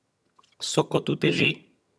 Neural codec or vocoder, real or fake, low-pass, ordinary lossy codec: vocoder, 22.05 kHz, 80 mel bands, HiFi-GAN; fake; none; none